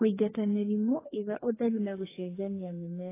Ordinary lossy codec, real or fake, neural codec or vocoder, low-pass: AAC, 16 kbps; fake; codec, 44.1 kHz, 2.6 kbps, SNAC; 3.6 kHz